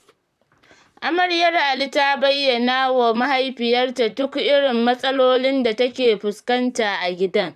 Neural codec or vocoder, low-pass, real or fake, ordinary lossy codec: vocoder, 44.1 kHz, 128 mel bands, Pupu-Vocoder; 14.4 kHz; fake; none